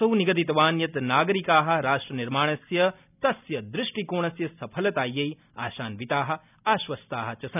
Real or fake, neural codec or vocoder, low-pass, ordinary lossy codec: real; none; 3.6 kHz; none